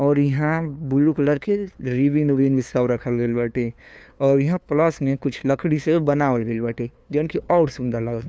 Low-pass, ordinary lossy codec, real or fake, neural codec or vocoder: none; none; fake; codec, 16 kHz, 2 kbps, FunCodec, trained on LibriTTS, 25 frames a second